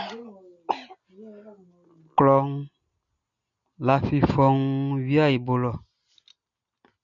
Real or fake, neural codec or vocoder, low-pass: real; none; 7.2 kHz